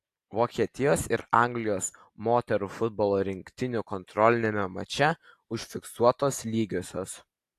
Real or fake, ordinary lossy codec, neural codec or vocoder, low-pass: real; AAC, 64 kbps; none; 14.4 kHz